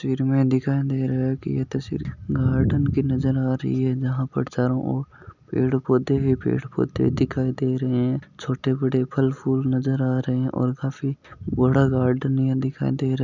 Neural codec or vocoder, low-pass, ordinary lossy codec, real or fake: none; 7.2 kHz; none; real